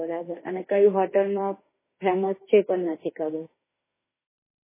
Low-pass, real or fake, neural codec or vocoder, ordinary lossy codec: 3.6 kHz; fake; autoencoder, 48 kHz, 32 numbers a frame, DAC-VAE, trained on Japanese speech; MP3, 16 kbps